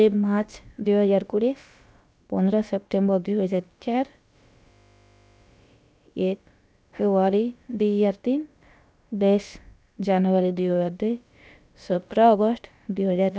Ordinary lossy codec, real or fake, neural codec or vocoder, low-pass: none; fake; codec, 16 kHz, about 1 kbps, DyCAST, with the encoder's durations; none